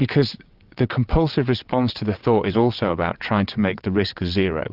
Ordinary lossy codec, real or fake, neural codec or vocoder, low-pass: Opus, 24 kbps; fake; vocoder, 22.05 kHz, 80 mel bands, WaveNeXt; 5.4 kHz